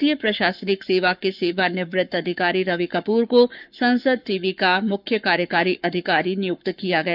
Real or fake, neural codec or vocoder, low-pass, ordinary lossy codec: fake; codec, 44.1 kHz, 7.8 kbps, Pupu-Codec; 5.4 kHz; none